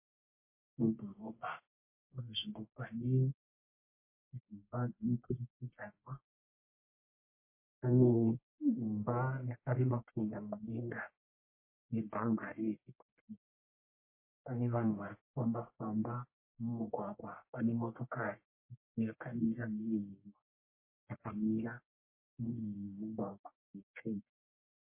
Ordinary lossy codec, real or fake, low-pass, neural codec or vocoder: MP3, 24 kbps; fake; 3.6 kHz; codec, 44.1 kHz, 1.7 kbps, Pupu-Codec